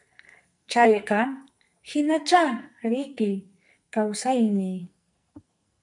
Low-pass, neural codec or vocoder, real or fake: 10.8 kHz; codec, 44.1 kHz, 2.6 kbps, SNAC; fake